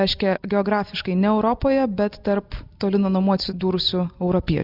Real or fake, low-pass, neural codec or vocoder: real; 5.4 kHz; none